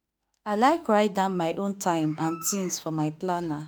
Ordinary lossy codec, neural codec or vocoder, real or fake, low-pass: none; autoencoder, 48 kHz, 32 numbers a frame, DAC-VAE, trained on Japanese speech; fake; none